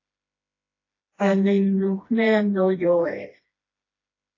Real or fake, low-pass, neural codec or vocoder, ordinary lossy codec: fake; 7.2 kHz; codec, 16 kHz, 1 kbps, FreqCodec, smaller model; AAC, 32 kbps